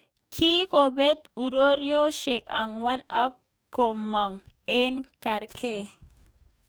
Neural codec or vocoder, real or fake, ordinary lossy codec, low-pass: codec, 44.1 kHz, 2.6 kbps, DAC; fake; none; none